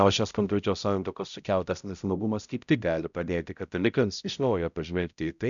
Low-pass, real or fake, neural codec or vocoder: 7.2 kHz; fake; codec, 16 kHz, 0.5 kbps, X-Codec, HuBERT features, trained on balanced general audio